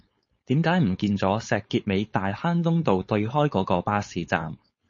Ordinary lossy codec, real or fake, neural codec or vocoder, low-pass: MP3, 32 kbps; fake; codec, 16 kHz, 4.8 kbps, FACodec; 7.2 kHz